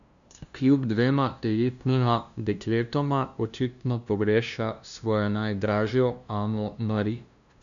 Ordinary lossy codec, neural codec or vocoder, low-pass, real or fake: none; codec, 16 kHz, 0.5 kbps, FunCodec, trained on LibriTTS, 25 frames a second; 7.2 kHz; fake